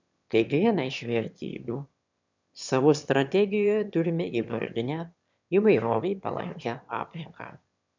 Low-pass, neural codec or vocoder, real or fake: 7.2 kHz; autoencoder, 22.05 kHz, a latent of 192 numbers a frame, VITS, trained on one speaker; fake